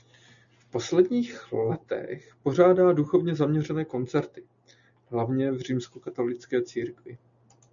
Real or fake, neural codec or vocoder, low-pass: real; none; 7.2 kHz